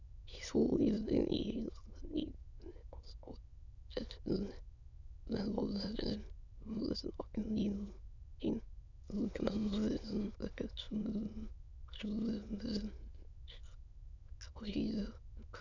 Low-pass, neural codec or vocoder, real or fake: 7.2 kHz; autoencoder, 22.05 kHz, a latent of 192 numbers a frame, VITS, trained on many speakers; fake